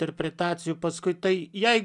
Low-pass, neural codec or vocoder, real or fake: 10.8 kHz; none; real